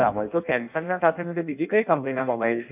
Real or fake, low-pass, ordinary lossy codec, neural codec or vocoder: fake; 3.6 kHz; none; codec, 16 kHz in and 24 kHz out, 0.6 kbps, FireRedTTS-2 codec